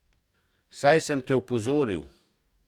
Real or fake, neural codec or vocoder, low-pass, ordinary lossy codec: fake; codec, 44.1 kHz, 2.6 kbps, DAC; 19.8 kHz; Opus, 64 kbps